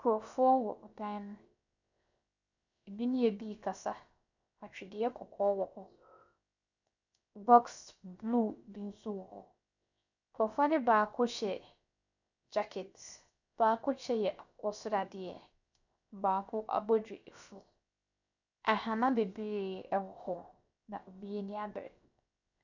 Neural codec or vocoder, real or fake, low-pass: codec, 16 kHz, 0.7 kbps, FocalCodec; fake; 7.2 kHz